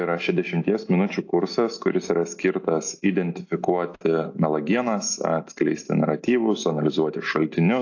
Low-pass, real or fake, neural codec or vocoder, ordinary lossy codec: 7.2 kHz; real; none; AAC, 48 kbps